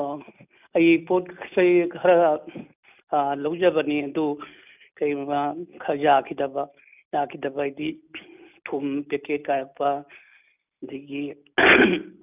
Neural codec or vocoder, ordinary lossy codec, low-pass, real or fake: none; none; 3.6 kHz; real